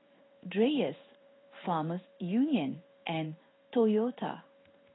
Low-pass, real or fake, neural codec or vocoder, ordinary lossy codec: 7.2 kHz; fake; codec, 16 kHz in and 24 kHz out, 1 kbps, XY-Tokenizer; AAC, 16 kbps